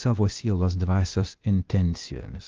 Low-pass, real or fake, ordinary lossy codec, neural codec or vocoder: 7.2 kHz; fake; Opus, 24 kbps; codec, 16 kHz, 0.8 kbps, ZipCodec